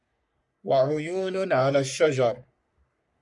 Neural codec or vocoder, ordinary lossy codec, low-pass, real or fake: codec, 44.1 kHz, 3.4 kbps, Pupu-Codec; MP3, 96 kbps; 10.8 kHz; fake